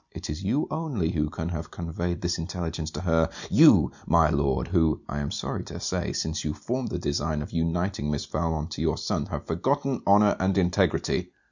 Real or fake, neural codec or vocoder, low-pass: real; none; 7.2 kHz